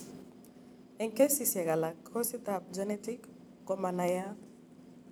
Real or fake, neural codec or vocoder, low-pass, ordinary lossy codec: fake; vocoder, 44.1 kHz, 128 mel bands every 512 samples, BigVGAN v2; none; none